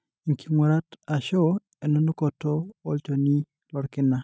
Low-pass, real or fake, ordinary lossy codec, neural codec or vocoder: none; real; none; none